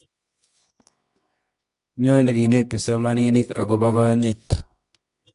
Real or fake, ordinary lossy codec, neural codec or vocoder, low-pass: fake; AAC, 64 kbps; codec, 24 kHz, 0.9 kbps, WavTokenizer, medium music audio release; 10.8 kHz